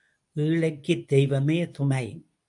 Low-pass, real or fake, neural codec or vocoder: 10.8 kHz; fake; codec, 24 kHz, 0.9 kbps, WavTokenizer, medium speech release version 2